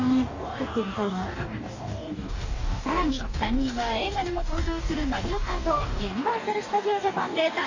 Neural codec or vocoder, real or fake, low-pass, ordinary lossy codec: codec, 44.1 kHz, 2.6 kbps, DAC; fake; 7.2 kHz; none